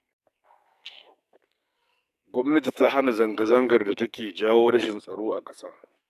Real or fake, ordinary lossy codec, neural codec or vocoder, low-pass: fake; AAC, 96 kbps; codec, 44.1 kHz, 2.6 kbps, SNAC; 14.4 kHz